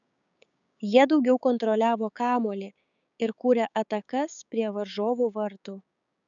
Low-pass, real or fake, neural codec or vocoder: 7.2 kHz; fake; codec, 16 kHz, 6 kbps, DAC